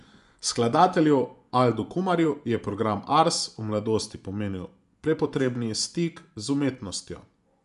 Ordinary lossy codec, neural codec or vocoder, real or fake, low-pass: none; vocoder, 24 kHz, 100 mel bands, Vocos; fake; 10.8 kHz